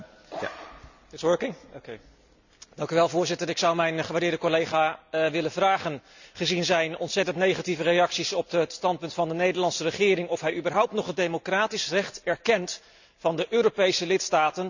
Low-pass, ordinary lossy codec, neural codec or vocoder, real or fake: 7.2 kHz; none; none; real